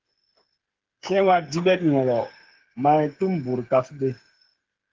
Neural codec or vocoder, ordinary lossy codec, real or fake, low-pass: codec, 16 kHz, 8 kbps, FreqCodec, smaller model; Opus, 16 kbps; fake; 7.2 kHz